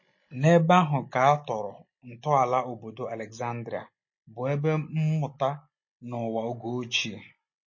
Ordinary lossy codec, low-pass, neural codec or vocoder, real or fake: MP3, 32 kbps; 7.2 kHz; none; real